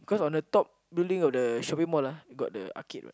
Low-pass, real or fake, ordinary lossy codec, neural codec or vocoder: none; real; none; none